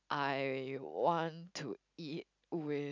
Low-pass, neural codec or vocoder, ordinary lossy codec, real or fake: 7.2 kHz; none; none; real